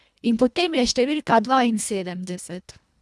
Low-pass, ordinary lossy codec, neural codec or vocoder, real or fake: none; none; codec, 24 kHz, 1.5 kbps, HILCodec; fake